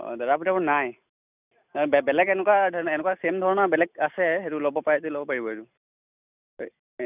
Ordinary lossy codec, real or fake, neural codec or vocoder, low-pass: none; real; none; 3.6 kHz